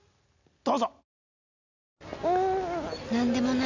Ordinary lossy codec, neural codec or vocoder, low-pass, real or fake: none; none; 7.2 kHz; real